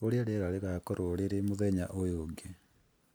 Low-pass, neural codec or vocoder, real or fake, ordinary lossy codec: none; none; real; none